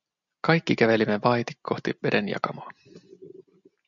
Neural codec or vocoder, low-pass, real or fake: none; 7.2 kHz; real